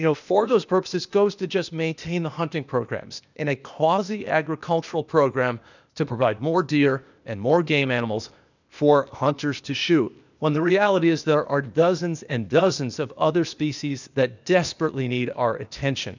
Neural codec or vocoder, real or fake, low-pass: codec, 16 kHz, 0.8 kbps, ZipCodec; fake; 7.2 kHz